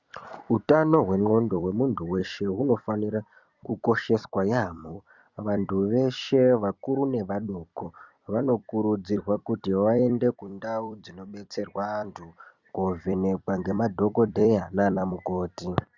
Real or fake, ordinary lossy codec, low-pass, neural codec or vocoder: fake; Opus, 64 kbps; 7.2 kHz; vocoder, 44.1 kHz, 128 mel bands every 256 samples, BigVGAN v2